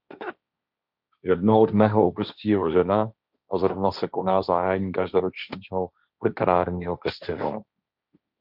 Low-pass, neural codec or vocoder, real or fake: 5.4 kHz; codec, 16 kHz, 1.1 kbps, Voila-Tokenizer; fake